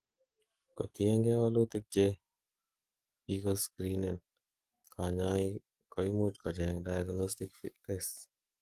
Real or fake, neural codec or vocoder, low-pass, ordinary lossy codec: fake; codec, 44.1 kHz, 7.8 kbps, DAC; 14.4 kHz; Opus, 24 kbps